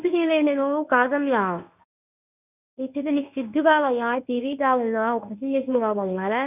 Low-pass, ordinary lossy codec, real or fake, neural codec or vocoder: 3.6 kHz; none; fake; codec, 24 kHz, 0.9 kbps, WavTokenizer, medium speech release version 1